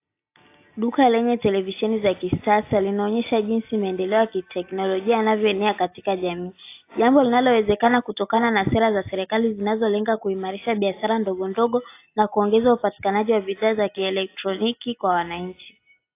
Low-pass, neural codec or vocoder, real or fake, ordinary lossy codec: 3.6 kHz; none; real; AAC, 24 kbps